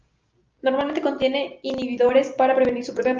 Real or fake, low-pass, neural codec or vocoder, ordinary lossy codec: real; 7.2 kHz; none; Opus, 24 kbps